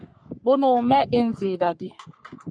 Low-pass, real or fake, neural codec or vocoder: 9.9 kHz; fake; codec, 44.1 kHz, 3.4 kbps, Pupu-Codec